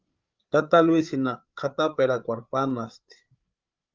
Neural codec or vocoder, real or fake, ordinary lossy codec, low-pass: codec, 16 kHz, 8 kbps, FreqCodec, larger model; fake; Opus, 32 kbps; 7.2 kHz